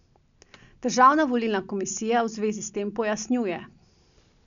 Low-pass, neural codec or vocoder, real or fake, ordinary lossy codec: 7.2 kHz; none; real; none